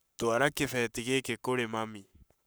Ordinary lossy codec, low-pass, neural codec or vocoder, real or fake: none; none; codec, 44.1 kHz, 7.8 kbps, Pupu-Codec; fake